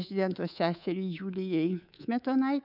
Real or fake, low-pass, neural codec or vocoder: fake; 5.4 kHz; codec, 24 kHz, 3.1 kbps, DualCodec